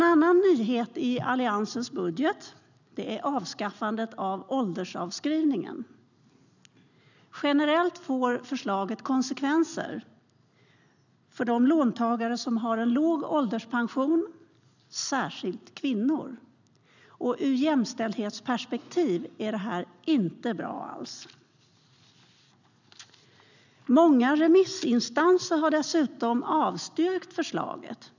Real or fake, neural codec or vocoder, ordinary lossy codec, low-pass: real; none; none; 7.2 kHz